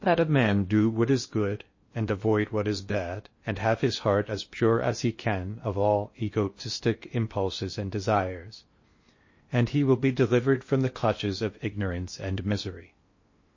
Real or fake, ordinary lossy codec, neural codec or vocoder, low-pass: fake; MP3, 32 kbps; codec, 16 kHz in and 24 kHz out, 0.6 kbps, FocalCodec, streaming, 2048 codes; 7.2 kHz